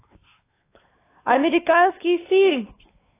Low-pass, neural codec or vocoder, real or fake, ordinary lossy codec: 3.6 kHz; codec, 16 kHz, 4 kbps, X-Codec, WavLM features, trained on Multilingual LibriSpeech; fake; AAC, 16 kbps